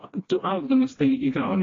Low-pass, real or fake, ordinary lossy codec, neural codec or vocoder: 7.2 kHz; fake; AAC, 32 kbps; codec, 16 kHz, 1 kbps, FreqCodec, smaller model